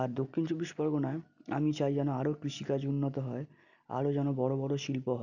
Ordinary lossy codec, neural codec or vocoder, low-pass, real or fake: none; none; 7.2 kHz; real